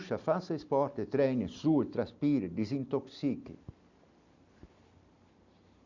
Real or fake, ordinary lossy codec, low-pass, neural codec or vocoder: real; none; 7.2 kHz; none